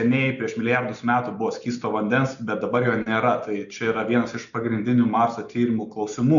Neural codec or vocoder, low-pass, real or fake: none; 7.2 kHz; real